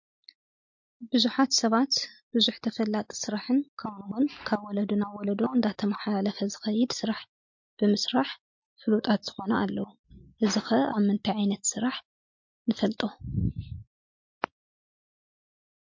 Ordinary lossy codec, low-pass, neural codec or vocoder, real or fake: MP3, 48 kbps; 7.2 kHz; none; real